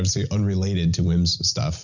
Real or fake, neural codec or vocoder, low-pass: real; none; 7.2 kHz